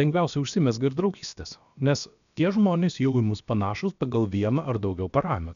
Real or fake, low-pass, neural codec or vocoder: fake; 7.2 kHz; codec, 16 kHz, about 1 kbps, DyCAST, with the encoder's durations